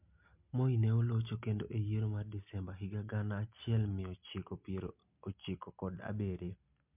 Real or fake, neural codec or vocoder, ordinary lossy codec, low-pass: real; none; MP3, 32 kbps; 3.6 kHz